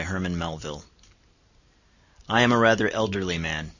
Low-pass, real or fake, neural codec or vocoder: 7.2 kHz; real; none